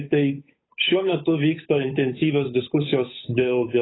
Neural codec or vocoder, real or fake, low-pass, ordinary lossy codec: codec, 16 kHz, 8 kbps, FunCodec, trained on Chinese and English, 25 frames a second; fake; 7.2 kHz; AAC, 16 kbps